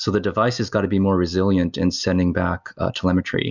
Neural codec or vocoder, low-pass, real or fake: none; 7.2 kHz; real